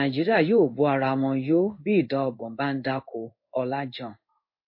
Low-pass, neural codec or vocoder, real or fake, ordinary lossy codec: 5.4 kHz; codec, 16 kHz in and 24 kHz out, 1 kbps, XY-Tokenizer; fake; MP3, 32 kbps